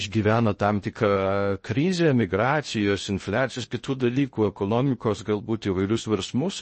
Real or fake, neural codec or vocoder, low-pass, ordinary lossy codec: fake; codec, 16 kHz in and 24 kHz out, 0.6 kbps, FocalCodec, streaming, 2048 codes; 10.8 kHz; MP3, 32 kbps